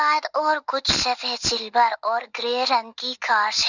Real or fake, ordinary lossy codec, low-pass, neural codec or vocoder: real; none; 7.2 kHz; none